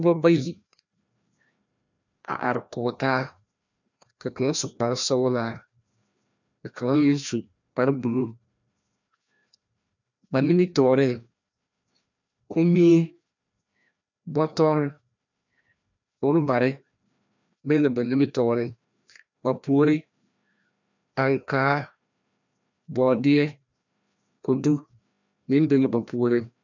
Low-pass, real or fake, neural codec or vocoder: 7.2 kHz; fake; codec, 16 kHz, 1 kbps, FreqCodec, larger model